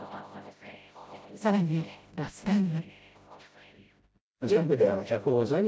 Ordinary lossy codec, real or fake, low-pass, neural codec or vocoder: none; fake; none; codec, 16 kHz, 0.5 kbps, FreqCodec, smaller model